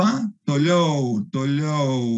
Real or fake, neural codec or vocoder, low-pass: real; none; 10.8 kHz